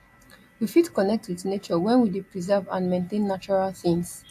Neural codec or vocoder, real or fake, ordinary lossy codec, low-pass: none; real; AAC, 64 kbps; 14.4 kHz